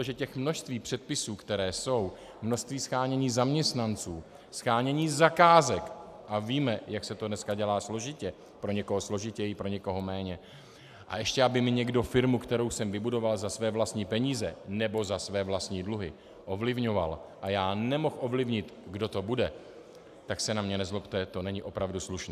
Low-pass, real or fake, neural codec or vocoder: 14.4 kHz; real; none